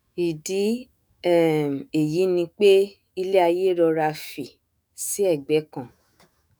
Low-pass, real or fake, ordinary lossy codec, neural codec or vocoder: none; fake; none; autoencoder, 48 kHz, 128 numbers a frame, DAC-VAE, trained on Japanese speech